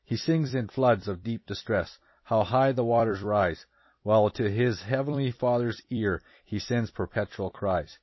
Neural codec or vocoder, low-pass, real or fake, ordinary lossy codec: vocoder, 44.1 kHz, 128 mel bands every 256 samples, BigVGAN v2; 7.2 kHz; fake; MP3, 24 kbps